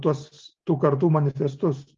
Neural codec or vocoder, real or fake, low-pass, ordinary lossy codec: none; real; 7.2 kHz; Opus, 32 kbps